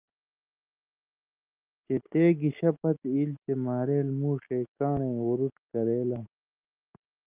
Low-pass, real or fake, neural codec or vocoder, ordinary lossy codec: 3.6 kHz; real; none; Opus, 24 kbps